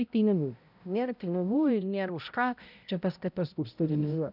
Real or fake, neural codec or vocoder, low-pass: fake; codec, 16 kHz, 0.5 kbps, X-Codec, HuBERT features, trained on balanced general audio; 5.4 kHz